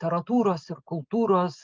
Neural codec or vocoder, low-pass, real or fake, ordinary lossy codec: none; 7.2 kHz; real; Opus, 32 kbps